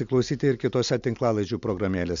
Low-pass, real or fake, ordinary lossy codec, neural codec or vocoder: 7.2 kHz; real; MP3, 64 kbps; none